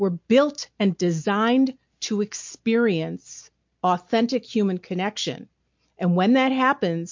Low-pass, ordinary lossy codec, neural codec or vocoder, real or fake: 7.2 kHz; MP3, 48 kbps; none; real